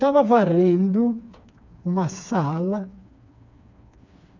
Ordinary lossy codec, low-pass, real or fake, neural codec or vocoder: none; 7.2 kHz; fake; codec, 16 kHz, 4 kbps, FreqCodec, smaller model